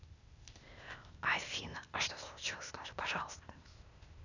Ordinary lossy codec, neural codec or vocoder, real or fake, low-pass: none; codec, 16 kHz, 0.8 kbps, ZipCodec; fake; 7.2 kHz